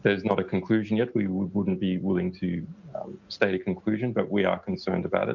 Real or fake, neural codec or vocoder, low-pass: real; none; 7.2 kHz